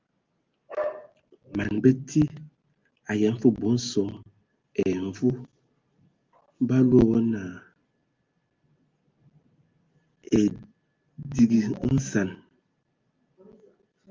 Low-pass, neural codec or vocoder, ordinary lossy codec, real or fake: 7.2 kHz; none; Opus, 32 kbps; real